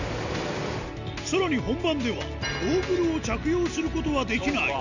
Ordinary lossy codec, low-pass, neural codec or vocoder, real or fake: none; 7.2 kHz; none; real